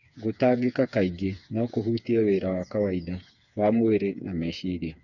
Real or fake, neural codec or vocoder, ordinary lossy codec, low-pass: fake; codec, 16 kHz, 4 kbps, FreqCodec, smaller model; none; 7.2 kHz